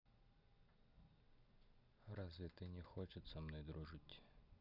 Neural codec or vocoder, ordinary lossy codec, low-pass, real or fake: none; none; 5.4 kHz; real